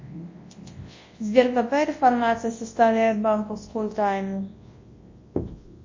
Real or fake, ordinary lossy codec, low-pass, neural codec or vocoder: fake; MP3, 32 kbps; 7.2 kHz; codec, 24 kHz, 0.9 kbps, WavTokenizer, large speech release